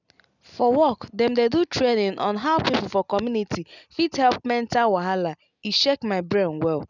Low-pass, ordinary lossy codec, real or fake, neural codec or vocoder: 7.2 kHz; none; real; none